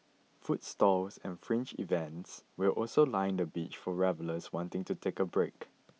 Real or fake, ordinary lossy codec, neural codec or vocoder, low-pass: real; none; none; none